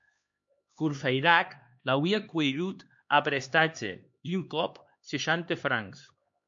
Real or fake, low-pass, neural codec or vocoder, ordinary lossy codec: fake; 7.2 kHz; codec, 16 kHz, 2 kbps, X-Codec, HuBERT features, trained on LibriSpeech; MP3, 48 kbps